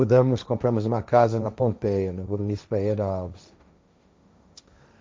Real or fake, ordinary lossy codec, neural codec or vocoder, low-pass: fake; none; codec, 16 kHz, 1.1 kbps, Voila-Tokenizer; none